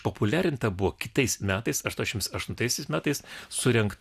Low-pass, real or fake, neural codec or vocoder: 14.4 kHz; real; none